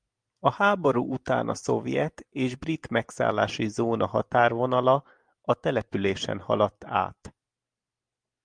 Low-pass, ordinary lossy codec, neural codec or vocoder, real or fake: 9.9 kHz; Opus, 32 kbps; none; real